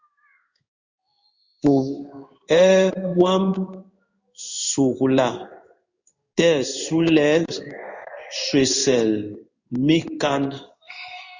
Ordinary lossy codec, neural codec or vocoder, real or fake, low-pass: Opus, 64 kbps; codec, 16 kHz in and 24 kHz out, 1 kbps, XY-Tokenizer; fake; 7.2 kHz